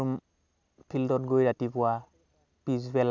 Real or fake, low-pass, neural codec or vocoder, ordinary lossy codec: real; 7.2 kHz; none; none